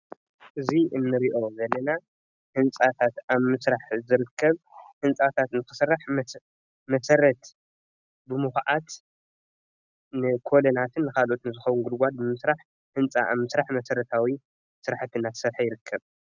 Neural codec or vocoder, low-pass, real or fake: none; 7.2 kHz; real